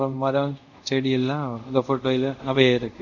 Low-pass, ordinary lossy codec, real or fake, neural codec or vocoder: 7.2 kHz; none; fake; codec, 24 kHz, 0.5 kbps, DualCodec